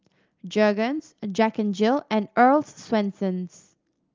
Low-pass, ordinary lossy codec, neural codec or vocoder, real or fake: 7.2 kHz; Opus, 32 kbps; none; real